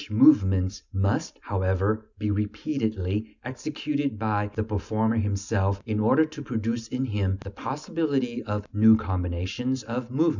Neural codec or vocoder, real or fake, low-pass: none; real; 7.2 kHz